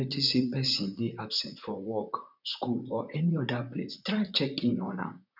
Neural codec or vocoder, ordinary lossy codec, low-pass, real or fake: vocoder, 44.1 kHz, 128 mel bands, Pupu-Vocoder; none; 5.4 kHz; fake